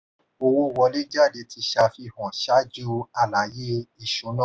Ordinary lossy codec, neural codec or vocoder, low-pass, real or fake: none; none; none; real